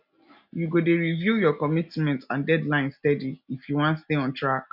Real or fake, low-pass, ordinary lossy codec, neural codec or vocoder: real; 5.4 kHz; none; none